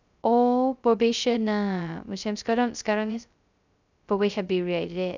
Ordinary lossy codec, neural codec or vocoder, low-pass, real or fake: none; codec, 16 kHz, 0.2 kbps, FocalCodec; 7.2 kHz; fake